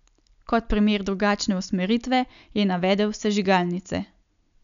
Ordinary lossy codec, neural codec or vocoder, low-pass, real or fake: none; none; 7.2 kHz; real